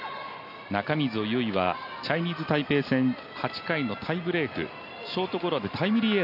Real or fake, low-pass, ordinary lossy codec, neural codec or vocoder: real; 5.4 kHz; none; none